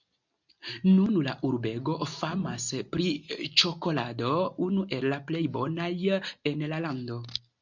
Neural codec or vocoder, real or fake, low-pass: none; real; 7.2 kHz